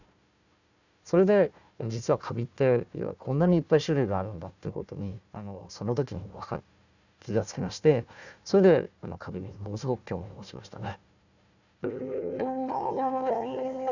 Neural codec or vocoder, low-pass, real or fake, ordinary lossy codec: codec, 16 kHz, 1 kbps, FunCodec, trained on Chinese and English, 50 frames a second; 7.2 kHz; fake; none